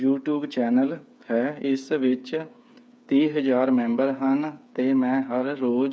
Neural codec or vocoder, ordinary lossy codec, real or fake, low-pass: codec, 16 kHz, 8 kbps, FreqCodec, smaller model; none; fake; none